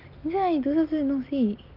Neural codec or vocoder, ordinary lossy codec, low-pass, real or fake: none; Opus, 32 kbps; 5.4 kHz; real